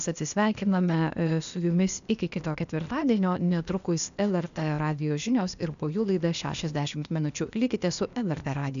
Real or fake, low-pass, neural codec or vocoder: fake; 7.2 kHz; codec, 16 kHz, 0.8 kbps, ZipCodec